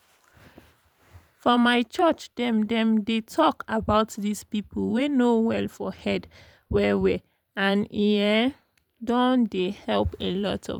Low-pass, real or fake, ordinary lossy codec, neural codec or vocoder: 19.8 kHz; fake; none; vocoder, 44.1 kHz, 128 mel bands every 512 samples, BigVGAN v2